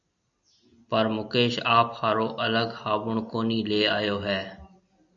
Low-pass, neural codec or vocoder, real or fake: 7.2 kHz; none; real